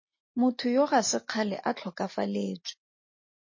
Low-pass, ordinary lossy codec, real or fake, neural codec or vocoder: 7.2 kHz; MP3, 32 kbps; real; none